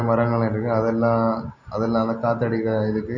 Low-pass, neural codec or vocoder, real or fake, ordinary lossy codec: 7.2 kHz; none; real; none